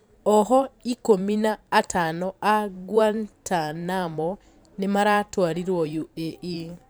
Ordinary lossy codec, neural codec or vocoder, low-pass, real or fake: none; vocoder, 44.1 kHz, 128 mel bands every 512 samples, BigVGAN v2; none; fake